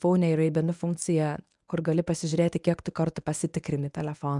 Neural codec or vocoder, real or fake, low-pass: codec, 24 kHz, 0.9 kbps, WavTokenizer, medium speech release version 1; fake; 10.8 kHz